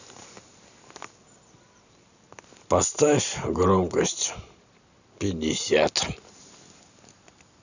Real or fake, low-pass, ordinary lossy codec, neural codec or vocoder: real; 7.2 kHz; none; none